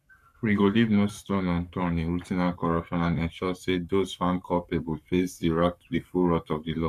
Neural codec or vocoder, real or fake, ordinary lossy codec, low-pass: codec, 44.1 kHz, 7.8 kbps, Pupu-Codec; fake; none; 14.4 kHz